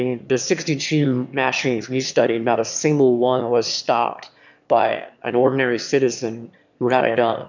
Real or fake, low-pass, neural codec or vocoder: fake; 7.2 kHz; autoencoder, 22.05 kHz, a latent of 192 numbers a frame, VITS, trained on one speaker